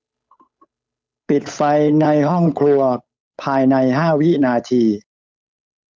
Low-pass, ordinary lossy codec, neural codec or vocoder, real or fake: none; none; codec, 16 kHz, 8 kbps, FunCodec, trained on Chinese and English, 25 frames a second; fake